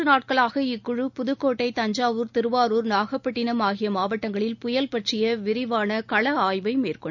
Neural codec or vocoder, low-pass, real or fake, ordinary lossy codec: none; 7.2 kHz; real; none